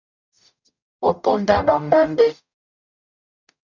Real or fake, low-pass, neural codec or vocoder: fake; 7.2 kHz; codec, 44.1 kHz, 0.9 kbps, DAC